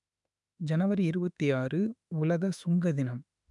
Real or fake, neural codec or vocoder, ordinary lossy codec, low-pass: fake; autoencoder, 48 kHz, 32 numbers a frame, DAC-VAE, trained on Japanese speech; none; 10.8 kHz